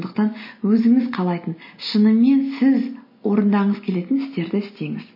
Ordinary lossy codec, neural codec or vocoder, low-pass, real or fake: MP3, 24 kbps; none; 5.4 kHz; real